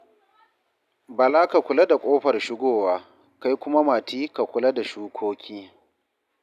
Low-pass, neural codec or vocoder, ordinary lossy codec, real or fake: 14.4 kHz; none; none; real